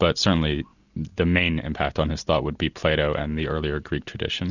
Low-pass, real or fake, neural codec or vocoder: 7.2 kHz; real; none